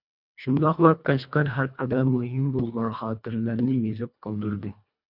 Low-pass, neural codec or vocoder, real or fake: 5.4 kHz; codec, 24 kHz, 1.5 kbps, HILCodec; fake